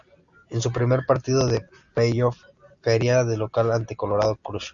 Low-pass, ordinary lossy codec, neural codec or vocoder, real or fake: 7.2 kHz; Opus, 64 kbps; none; real